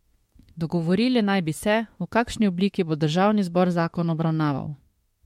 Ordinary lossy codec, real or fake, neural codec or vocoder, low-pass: MP3, 64 kbps; fake; autoencoder, 48 kHz, 32 numbers a frame, DAC-VAE, trained on Japanese speech; 19.8 kHz